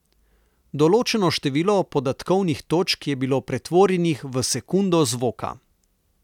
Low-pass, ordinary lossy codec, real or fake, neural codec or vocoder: 19.8 kHz; none; real; none